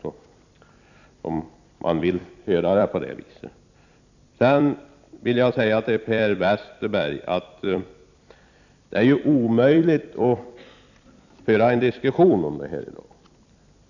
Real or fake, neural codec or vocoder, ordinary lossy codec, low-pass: fake; vocoder, 44.1 kHz, 128 mel bands every 256 samples, BigVGAN v2; none; 7.2 kHz